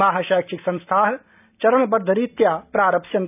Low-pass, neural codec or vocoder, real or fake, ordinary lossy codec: 3.6 kHz; none; real; none